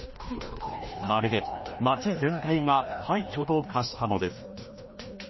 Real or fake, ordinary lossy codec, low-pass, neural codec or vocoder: fake; MP3, 24 kbps; 7.2 kHz; codec, 16 kHz, 1 kbps, FreqCodec, larger model